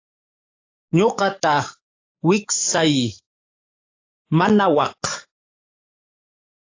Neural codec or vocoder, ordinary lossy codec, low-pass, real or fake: codec, 44.1 kHz, 7.8 kbps, DAC; AAC, 32 kbps; 7.2 kHz; fake